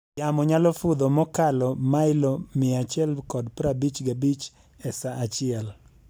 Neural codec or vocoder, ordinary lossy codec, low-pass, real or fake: none; none; none; real